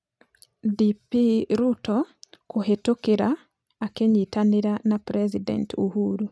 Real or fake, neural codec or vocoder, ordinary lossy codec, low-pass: real; none; none; none